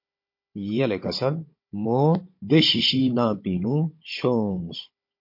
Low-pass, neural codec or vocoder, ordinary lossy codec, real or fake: 5.4 kHz; codec, 16 kHz, 16 kbps, FunCodec, trained on Chinese and English, 50 frames a second; MP3, 32 kbps; fake